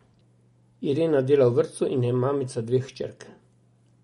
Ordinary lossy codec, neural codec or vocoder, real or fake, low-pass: MP3, 48 kbps; none; real; 19.8 kHz